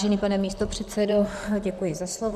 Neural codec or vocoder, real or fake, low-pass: vocoder, 44.1 kHz, 128 mel bands, Pupu-Vocoder; fake; 14.4 kHz